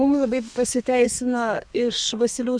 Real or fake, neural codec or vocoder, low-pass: fake; codec, 32 kHz, 1.9 kbps, SNAC; 9.9 kHz